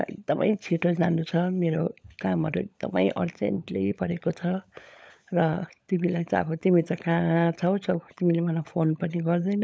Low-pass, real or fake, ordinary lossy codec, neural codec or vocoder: none; fake; none; codec, 16 kHz, 16 kbps, FunCodec, trained on LibriTTS, 50 frames a second